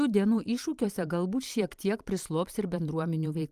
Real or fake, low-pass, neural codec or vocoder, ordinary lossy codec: fake; 14.4 kHz; vocoder, 44.1 kHz, 128 mel bands every 512 samples, BigVGAN v2; Opus, 32 kbps